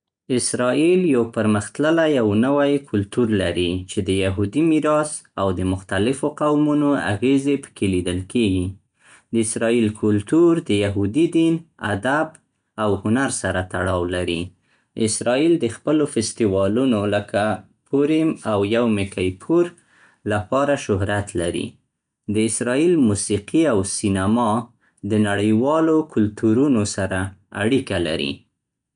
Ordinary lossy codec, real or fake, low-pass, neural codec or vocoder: none; real; 9.9 kHz; none